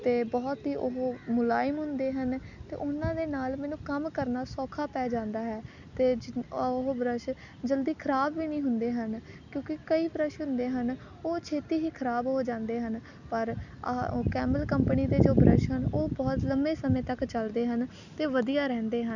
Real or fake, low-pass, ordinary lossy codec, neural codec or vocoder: real; 7.2 kHz; none; none